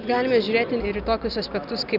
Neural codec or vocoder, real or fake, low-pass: none; real; 5.4 kHz